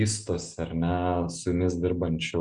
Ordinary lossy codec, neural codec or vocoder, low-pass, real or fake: Opus, 64 kbps; none; 9.9 kHz; real